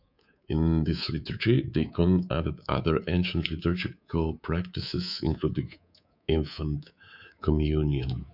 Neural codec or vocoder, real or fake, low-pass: codec, 24 kHz, 3.1 kbps, DualCodec; fake; 5.4 kHz